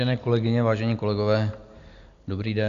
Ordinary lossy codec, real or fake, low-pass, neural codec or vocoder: Opus, 64 kbps; real; 7.2 kHz; none